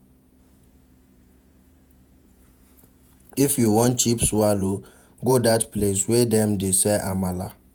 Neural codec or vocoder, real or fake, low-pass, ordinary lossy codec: vocoder, 48 kHz, 128 mel bands, Vocos; fake; none; none